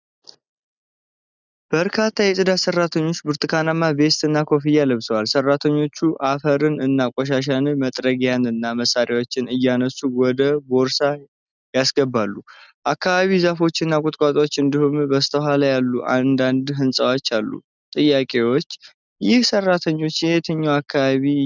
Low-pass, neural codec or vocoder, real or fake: 7.2 kHz; none; real